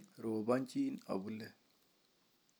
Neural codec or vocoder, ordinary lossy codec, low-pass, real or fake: none; none; none; real